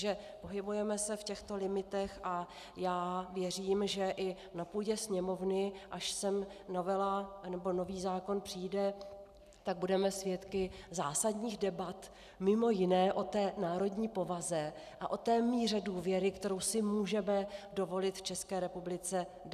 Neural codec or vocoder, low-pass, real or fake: none; 14.4 kHz; real